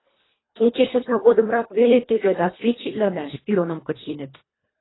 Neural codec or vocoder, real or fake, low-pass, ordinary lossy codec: codec, 24 kHz, 1.5 kbps, HILCodec; fake; 7.2 kHz; AAC, 16 kbps